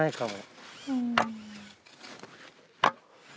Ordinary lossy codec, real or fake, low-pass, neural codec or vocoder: none; real; none; none